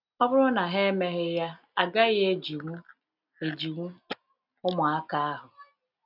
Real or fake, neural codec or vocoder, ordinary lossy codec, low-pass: real; none; none; 5.4 kHz